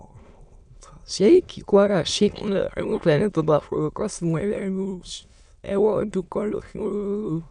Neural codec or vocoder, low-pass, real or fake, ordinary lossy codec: autoencoder, 22.05 kHz, a latent of 192 numbers a frame, VITS, trained on many speakers; 9.9 kHz; fake; none